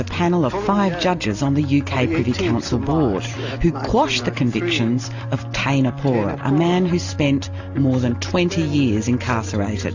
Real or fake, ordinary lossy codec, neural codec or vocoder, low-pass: real; AAC, 48 kbps; none; 7.2 kHz